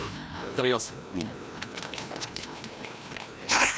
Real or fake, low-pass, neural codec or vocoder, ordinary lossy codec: fake; none; codec, 16 kHz, 1 kbps, FreqCodec, larger model; none